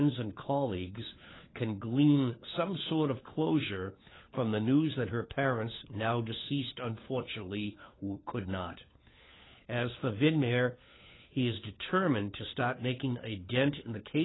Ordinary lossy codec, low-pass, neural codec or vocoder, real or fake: AAC, 16 kbps; 7.2 kHz; codec, 16 kHz, 4 kbps, FunCodec, trained on LibriTTS, 50 frames a second; fake